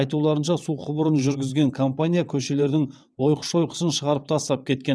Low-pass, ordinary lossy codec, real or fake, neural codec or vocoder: none; none; fake; vocoder, 22.05 kHz, 80 mel bands, WaveNeXt